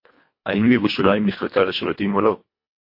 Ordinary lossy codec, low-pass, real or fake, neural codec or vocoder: MP3, 32 kbps; 5.4 kHz; fake; codec, 24 kHz, 1.5 kbps, HILCodec